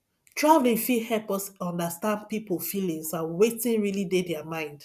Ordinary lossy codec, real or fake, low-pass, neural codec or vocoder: none; real; 14.4 kHz; none